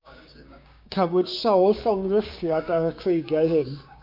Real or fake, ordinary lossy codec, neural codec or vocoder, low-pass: fake; MP3, 48 kbps; codec, 16 kHz, 6 kbps, DAC; 5.4 kHz